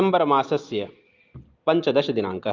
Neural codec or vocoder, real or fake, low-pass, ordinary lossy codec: none; real; 7.2 kHz; Opus, 32 kbps